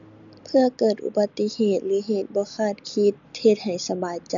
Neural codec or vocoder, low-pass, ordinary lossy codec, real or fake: none; 7.2 kHz; none; real